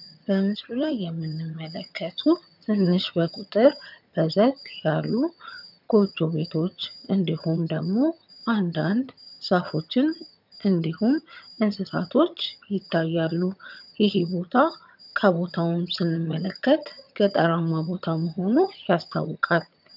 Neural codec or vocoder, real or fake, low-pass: vocoder, 22.05 kHz, 80 mel bands, HiFi-GAN; fake; 5.4 kHz